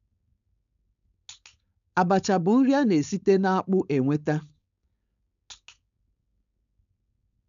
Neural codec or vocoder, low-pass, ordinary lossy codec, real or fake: codec, 16 kHz, 4.8 kbps, FACodec; 7.2 kHz; none; fake